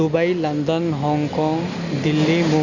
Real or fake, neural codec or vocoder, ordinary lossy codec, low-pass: real; none; Opus, 64 kbps; 7.2 kHz